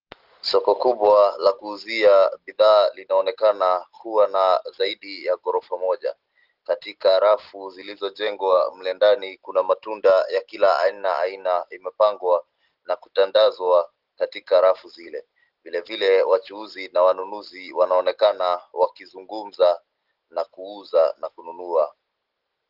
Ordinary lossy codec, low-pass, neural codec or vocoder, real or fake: Opus, 16 kbps; 5.4 kHz; none; real